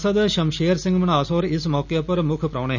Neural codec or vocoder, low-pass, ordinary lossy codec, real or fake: none; 7.2 kHz; none; real